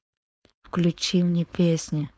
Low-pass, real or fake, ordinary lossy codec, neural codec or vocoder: none; fake; none; codec, 16 kHz, 4.8 kbps, FACodec